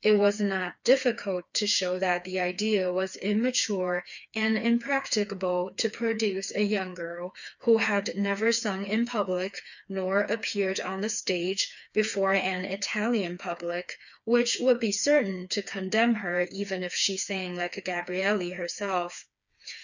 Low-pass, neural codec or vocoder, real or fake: 7.2 kHz; codec, 16 kHz, 4 kbps, FreqCodec, smaller model; fake